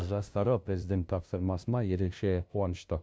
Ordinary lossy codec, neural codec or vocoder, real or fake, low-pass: none; codec, 16 kHz, 0.5 kbps, FunCodec, trained on LibriTTS, 25 frames a second; fake; none